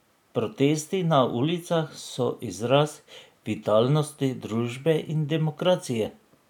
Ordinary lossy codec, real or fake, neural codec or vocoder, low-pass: none; real; none; 19.8 kHz